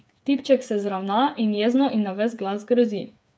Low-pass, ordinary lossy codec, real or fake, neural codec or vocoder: none; none; fake; codec, 16 kHz, 8 kbps, FreqCodec, smaller model